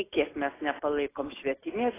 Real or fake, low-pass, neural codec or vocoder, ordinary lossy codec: real; 3.6 kHz; none; AAC, 16 kbps